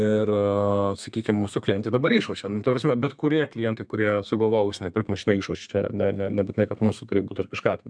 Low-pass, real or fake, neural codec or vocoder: 9.9 kHz; fake; codec, 32 kHz, 1.9 kbps, SNAC